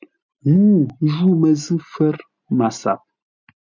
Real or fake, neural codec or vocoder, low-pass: real; none; 7.2 kHz